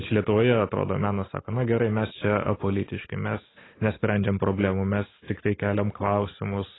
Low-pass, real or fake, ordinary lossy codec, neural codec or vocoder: 7.2 kHz; real; AAC, 16 kbps; none